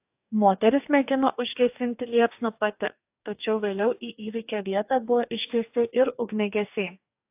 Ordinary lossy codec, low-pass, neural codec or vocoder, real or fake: AAC, 32 kbps; 3.6 kHz; codec, 44.1 kHz, 2.6 kbps, DAC; fake